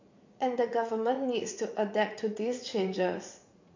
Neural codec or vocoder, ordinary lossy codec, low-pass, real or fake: vocoder, 44.1 kHz, 80 mel bands, Vocos; MP3, 48 kbps; 7.2 kHz; fake